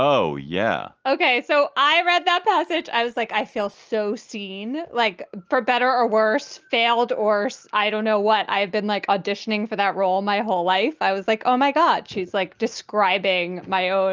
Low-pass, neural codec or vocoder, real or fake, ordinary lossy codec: 7.2 kHz; none; real; Opus, 24 kbps